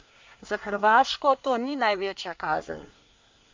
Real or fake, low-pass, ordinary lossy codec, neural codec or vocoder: fake; 7.2 kHz; MP3, 64 kbps; codec, 24 kHz, 1 kbps, SNAC